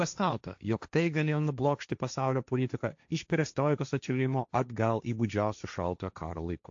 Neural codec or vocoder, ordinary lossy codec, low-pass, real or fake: codec, 16 kHz, 1.1 kbps, Voila-Tokenizer; AAC, 64 kbps; 7.2 kHz; fake